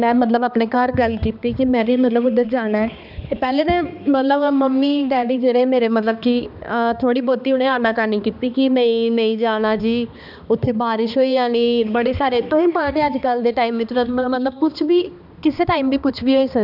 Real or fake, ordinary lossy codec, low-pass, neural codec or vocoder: fake; none; 5.4 kHz; codec, 16 kHz, 2 kbps, X-Codec, HuBERT features, trained on balanced general audio